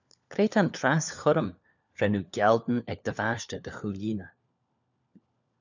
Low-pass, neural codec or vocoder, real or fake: 7.2 kHz; codec, 16 kHz, 4 kbps, FunCodec, trained on LibriTTS, 50 frames a second; fake